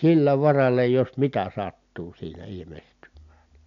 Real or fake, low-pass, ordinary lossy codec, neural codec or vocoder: real; 7.2 kHz; MP3, 64 kbps; none